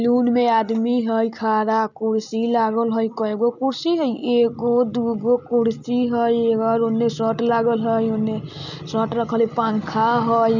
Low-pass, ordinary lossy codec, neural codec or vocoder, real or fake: 7.2 kHz; none; none; real